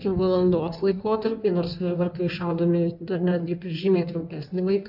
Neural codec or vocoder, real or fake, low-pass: codec, 16 kHz in and 24 kHz out, 1.1 kbps, FireRedTTS-2 codec; fake; 5.4 kHz